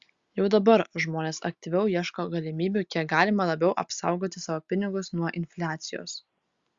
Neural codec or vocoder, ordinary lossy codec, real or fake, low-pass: none; Opus, 64 kbps; real; 7.2 kHz